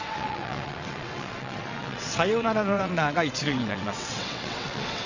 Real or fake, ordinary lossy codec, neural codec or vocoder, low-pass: fake; none; vocoder, 22.05 kHz, 80 mel bands, WaveNeXt; 7.2 kHz